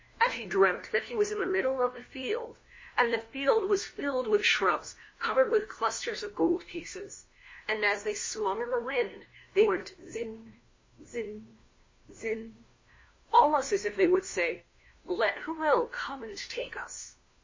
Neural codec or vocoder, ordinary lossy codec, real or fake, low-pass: codec, 16 kHz, 1 kbps, FunCodec, trained on LibriTTS, 50 frames a second; MP3, 32 kbps; fake; 7.2 kHz